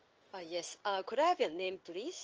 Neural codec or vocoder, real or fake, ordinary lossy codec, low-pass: codec, 16 kHz in and 24 kHz out, 1 kbps, XY-Tokenizer; fake; Opus, 24 kbps; 7.2 kHz